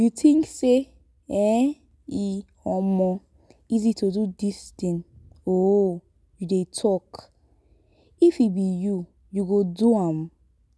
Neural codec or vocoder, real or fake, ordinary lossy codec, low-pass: none; real; none; none